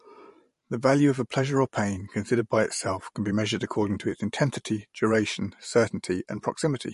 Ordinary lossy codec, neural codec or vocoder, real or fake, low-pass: MP3, 48 kbps; vocoder, 44.1 kHz, 128 mel bands every 512 samples, BigVGAN v2; fake; 14.4 kHz